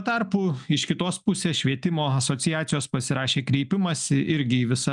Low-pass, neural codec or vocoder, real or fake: 10.8 kHz; none; real